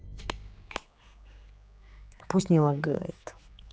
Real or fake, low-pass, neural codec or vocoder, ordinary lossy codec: fake; none; codec, 16 kHz, 4 kbps, X-Codec, HuBERT features, trained on general audio; none